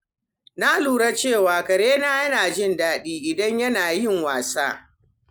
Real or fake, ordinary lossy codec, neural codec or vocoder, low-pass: real; none; none; none